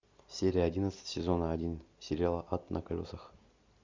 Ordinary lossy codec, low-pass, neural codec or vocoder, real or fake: MP3, 64 kbps; 7.2 kHz; none; real